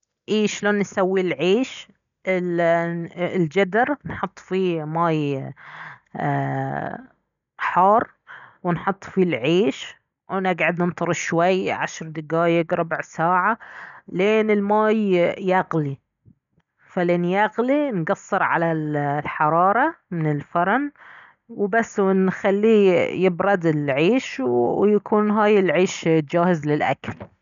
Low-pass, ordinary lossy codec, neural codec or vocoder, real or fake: 7.2 kHz; none; none; real